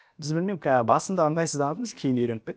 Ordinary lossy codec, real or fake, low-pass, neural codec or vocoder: none; fake; none; codec, 16 kHz, about 1 kbps, DyCAST, with the encoder's durations